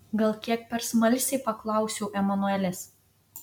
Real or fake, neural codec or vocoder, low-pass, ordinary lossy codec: real; none; 19.8 kHz; MP3, 96 kbps